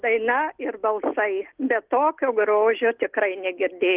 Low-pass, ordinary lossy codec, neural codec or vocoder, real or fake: 3.6 kHz; Opus, 16 kbps; none; real